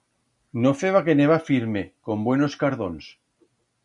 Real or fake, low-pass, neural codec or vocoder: real; 10.8 kHz; none